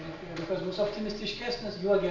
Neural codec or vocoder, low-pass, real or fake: none; 7.2 kHz; real